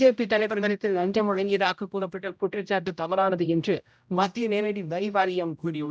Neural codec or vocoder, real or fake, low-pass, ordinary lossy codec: codec, 16 kHz, 0.5 kbps, X-Codec, HuBERT features, trained on general audio; fake; none; none